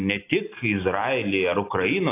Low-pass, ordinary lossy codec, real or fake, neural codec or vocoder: 3.6 kHz; AAC, 24 kbps; real; none